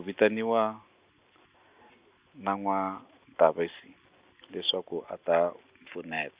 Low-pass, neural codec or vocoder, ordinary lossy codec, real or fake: 3.6 kHz; none; Opus, 64 kbps; real